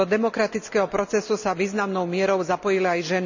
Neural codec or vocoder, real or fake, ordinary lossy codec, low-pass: none; real; none; 7.2 kHz